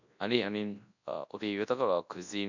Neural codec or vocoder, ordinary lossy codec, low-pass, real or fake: codec, 24 kHz, 0.9 kbps, WavTokenizer, large speech release; none; 7.2 kHz; fake